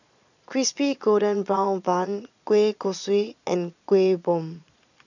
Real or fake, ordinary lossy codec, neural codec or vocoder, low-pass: fake; none; vocoder, 22.05 kHz, 80 mel bands, Vocos; 7.2 kHz